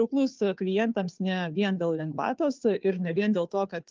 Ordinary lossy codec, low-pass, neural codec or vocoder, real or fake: Opus, 24 kbps; 7.2 kHz; codec, 16 kHz, 2 kbps, FunCodec, trained on Chinese and English, 25 frames a second; fake